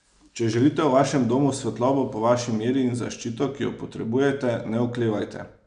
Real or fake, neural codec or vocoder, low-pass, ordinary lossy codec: real; none; 9.9 kHz; none